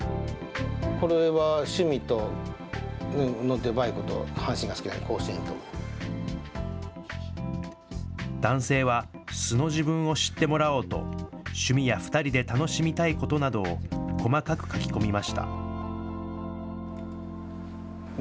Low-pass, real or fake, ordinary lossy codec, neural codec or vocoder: none; real; none; none